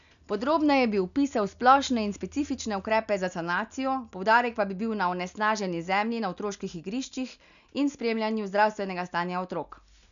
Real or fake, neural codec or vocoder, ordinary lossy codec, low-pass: real; none; none; 7.2 kHz